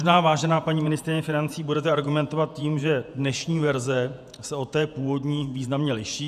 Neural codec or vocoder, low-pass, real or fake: vocoder, 48 kHz, 128 mel bands, Vocos; 14.4 kHz; fake